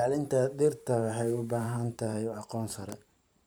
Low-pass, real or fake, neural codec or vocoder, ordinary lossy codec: none; fake; vocoder, 44.1 kHz, 128 mel bands every 512 samples, BigVGAN v2; none